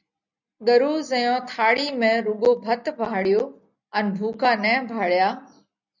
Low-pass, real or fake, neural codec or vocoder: 7.2 kHz; real; none